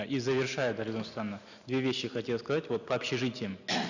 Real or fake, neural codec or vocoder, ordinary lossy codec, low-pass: real; none; none; 7.2 kHz